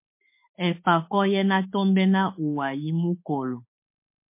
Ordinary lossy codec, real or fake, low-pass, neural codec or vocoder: MP3, 24 kbps; fake; 3.6 kHz; autoencoder, 48 kHz, 32 numbers a frame, DAC-VAE, trained on Japanese speech